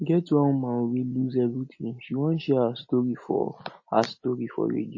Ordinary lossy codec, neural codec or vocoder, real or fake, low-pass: MP3, 32 kbps; none; real; 7.2 kHz